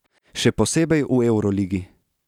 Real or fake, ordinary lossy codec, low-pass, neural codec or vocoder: real; none; 19.8 kHz; none